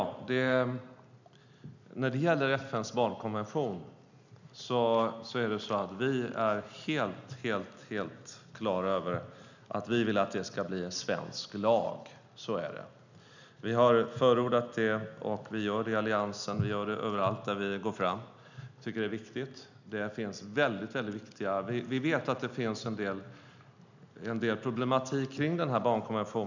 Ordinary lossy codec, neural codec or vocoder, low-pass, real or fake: none; none; 7.2 kHz; real